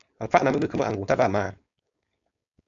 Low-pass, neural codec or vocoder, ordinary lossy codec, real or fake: 7.2 kHz; codec, 16 kHz, 4.8 kbps, FACodec; AAC, 64 kbps; fake